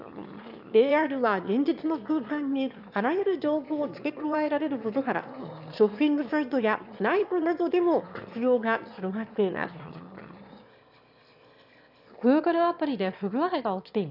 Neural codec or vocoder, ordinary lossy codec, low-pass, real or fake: autoencoder, 22.05 kHz, a latent of 192 numbers a frame, VITS, trained on one speaker; none; 5.4 kHz; fake